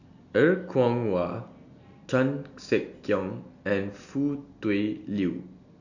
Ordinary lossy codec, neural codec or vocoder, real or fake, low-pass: none; none; real; 7.2 kHz